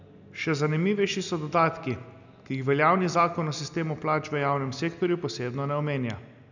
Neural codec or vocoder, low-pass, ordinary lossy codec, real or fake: none; 7.2 kHz; none; real